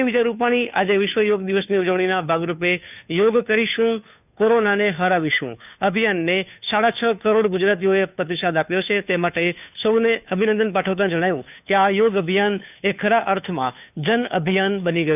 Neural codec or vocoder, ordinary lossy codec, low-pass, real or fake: codec, 16 kHz, 2 kbps, FunCodec, trained on Chinese and English, 25 frames a second; none; 3.6 kHz; fake